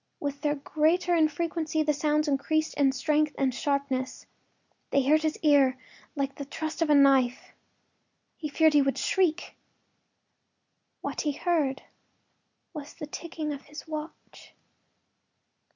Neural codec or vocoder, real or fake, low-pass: none; real; 7.2 kHz